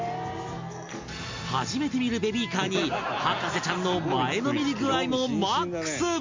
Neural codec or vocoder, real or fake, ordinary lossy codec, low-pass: none; real; MP3, 48 kbps; 7.2 kHz